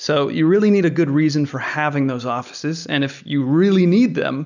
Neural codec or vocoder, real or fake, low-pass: none; real; 7.2 kHz